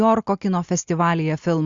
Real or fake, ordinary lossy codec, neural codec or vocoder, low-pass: real; Opus, 64 kbps; none; 7.2 kHz